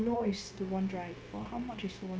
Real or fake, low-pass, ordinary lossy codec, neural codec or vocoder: fake; none; none; codec, 16 kHz, 0.9 kbps, LongCat-Audio-Codec